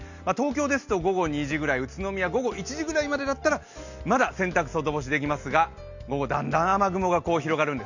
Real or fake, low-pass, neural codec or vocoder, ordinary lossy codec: real; 7.2 kHz; none; none